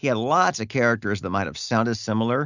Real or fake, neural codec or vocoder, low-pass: real; none; 7.2 kHz